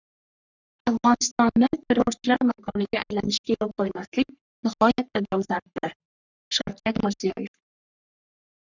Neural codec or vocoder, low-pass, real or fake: codec, 44.1 kHz, 3.4 kbps, Pupu-Codec; 7.2 kHz; fake